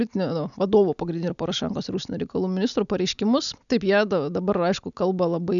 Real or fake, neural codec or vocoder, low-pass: real; none; 7.2 kHz